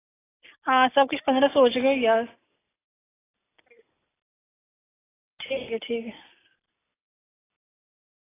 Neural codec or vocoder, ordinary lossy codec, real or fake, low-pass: none; AAC, 16 kbps; real; 3.6 kHz